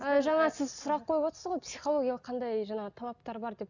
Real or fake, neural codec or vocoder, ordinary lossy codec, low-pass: real; none; none; 7.2 kHz